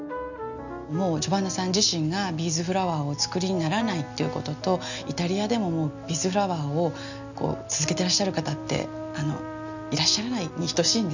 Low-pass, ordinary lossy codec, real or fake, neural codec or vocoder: 7.2 kHz; none; real; none